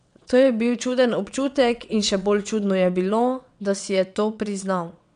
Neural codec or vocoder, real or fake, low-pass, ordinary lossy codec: vocoder, 22.05 kHz, 80 mel bands, WaveNeXt; fake; 9.9 kHz; MP3, 96 kbps